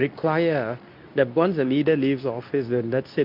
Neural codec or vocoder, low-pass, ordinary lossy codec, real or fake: codec, 24 kHz, 0.9 kbps, WavTokenizer, medium speech release version 2; 5.4 kHz; MP3, 48 kbps; fake